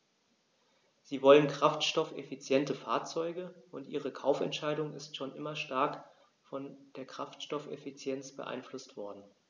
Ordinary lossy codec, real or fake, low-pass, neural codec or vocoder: none; real; none; none